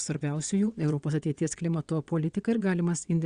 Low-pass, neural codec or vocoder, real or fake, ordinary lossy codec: 9.9 kHz; vocoder, 22.05 kHz, 80 mel bands, WaveNeXt; fake; Opus, 64 kbps